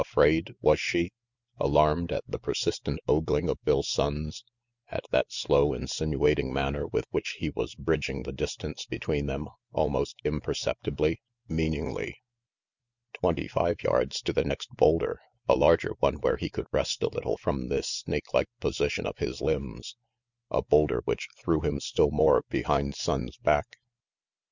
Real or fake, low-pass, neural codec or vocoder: real; 7.2 kHz; none